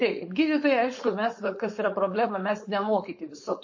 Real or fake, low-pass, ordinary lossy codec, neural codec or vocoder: fake; 7.2 kHz; MP3, 32 kbps; codec, 16 kHz, 4.8 kbps, FACodec